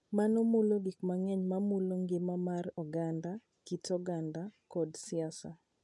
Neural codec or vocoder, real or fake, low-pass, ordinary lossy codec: none; real; 10.8 kHz; none